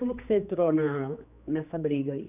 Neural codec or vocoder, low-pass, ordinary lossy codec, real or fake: codec, 16 kHz, 2 kbps, X-Codec, HuBERT features, trained on general audio; 3.6 kHz; none; fake